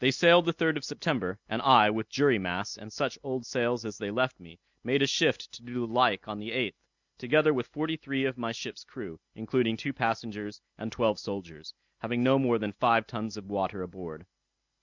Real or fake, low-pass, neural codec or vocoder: real; 7.2 kHz; none